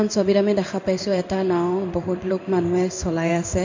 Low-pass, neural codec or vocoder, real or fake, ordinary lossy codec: 7.2 kHz; codec, 16 kHz in and 24 kHz out, 1 kbps, XY-Tokenizer; fake; MP3, 48 kbps